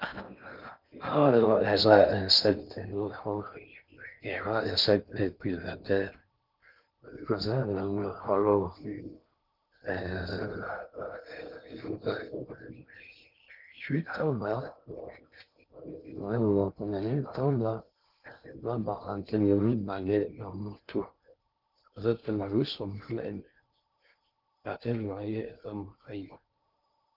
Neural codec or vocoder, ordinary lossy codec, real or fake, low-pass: codec, 16 kHz in and 24 kHz out, 0.6 kbps, FocalCodec, streaming, 4096 codes; Opus, 32 kbps; fake; 5.4 kHz